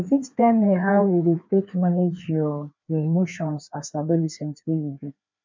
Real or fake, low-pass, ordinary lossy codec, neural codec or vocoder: fake; 7.2 kHz; MP3, 64 kbps; codec, 16 kHz, 2 kbps, FreqCodec, larger model